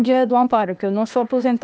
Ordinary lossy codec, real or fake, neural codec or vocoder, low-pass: none; fake; codec, 16 kHz, 0.8 kbps, ZipCodec; none